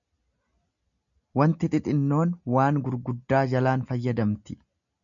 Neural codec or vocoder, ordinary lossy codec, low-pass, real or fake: none; MP3, 64 kbps; 7.2 kHz; real